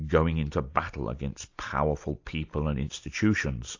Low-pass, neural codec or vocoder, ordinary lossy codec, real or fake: 7.2 kHz; none; AAC, 48 kbps; real